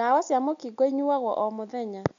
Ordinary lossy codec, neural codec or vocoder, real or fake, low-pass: none; none; real; 7.2 kHz